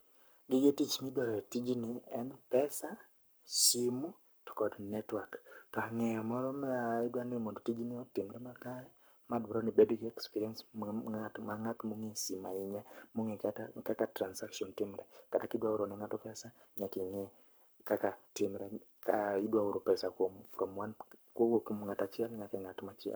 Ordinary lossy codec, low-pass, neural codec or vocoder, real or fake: none; none; codec, 44.1 kHz, 7.8 kbps, Pupu-Codec; fake